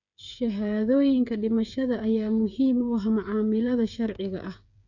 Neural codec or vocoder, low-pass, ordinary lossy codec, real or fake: codec, 16 kHz, 8 kbps, FreqCodec, smaller model; 7.2 kHz; none; fake